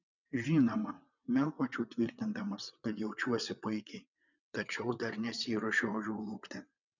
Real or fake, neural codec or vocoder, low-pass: fake; vocoder, 22.05 kHz, 80 mel bands, WaveNeXt; 7.2 kHz